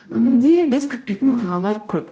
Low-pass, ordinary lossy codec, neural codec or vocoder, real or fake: none; none; codec, 16 kHz, 0.5 kbps, X-Codec, HuBERT features, trained on general audio; fake